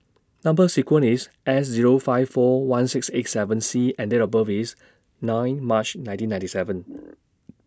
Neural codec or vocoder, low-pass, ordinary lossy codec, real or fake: none; none; none; real